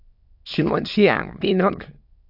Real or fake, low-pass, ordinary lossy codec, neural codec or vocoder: fake; 5.4 kHz; AAC, 48 kbps; autoencoder, 22.05 kHz, a latent of 192 numbers a frame, VITS, trained on many speakers